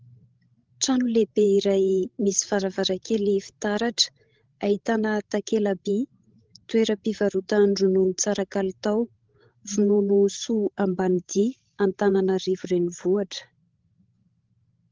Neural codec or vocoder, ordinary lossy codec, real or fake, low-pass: codec, 16 kHz, 16 kbps, FreqCodec, larger model; Opus, 16 kbps; fake; 7.2 kHz